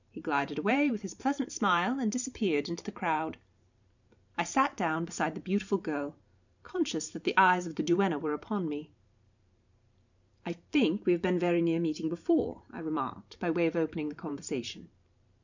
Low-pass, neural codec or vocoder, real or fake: 7.2 kHz; vocoder, 44.1 kHz, 128 mel bands every 512 samples, BigVGAN v2; fake